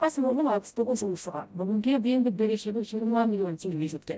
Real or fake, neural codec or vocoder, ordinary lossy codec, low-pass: fake; codec, 16 kHz, 0.5 kbps, FreqCodec, smaller model; none; none